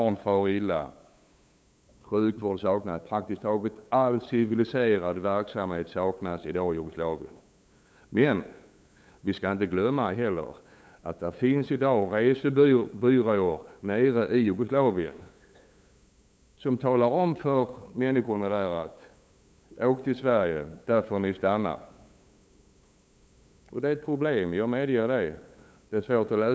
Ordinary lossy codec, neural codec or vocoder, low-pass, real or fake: none; codec, 16 kHz, 8 kbps, FunCodec, trained on LibriTTS, 25 frames a second; none; fake